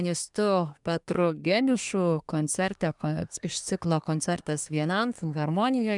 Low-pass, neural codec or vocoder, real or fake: 10.8 kHz; codec, 24 kHz, 1 kbps, SNAC; fake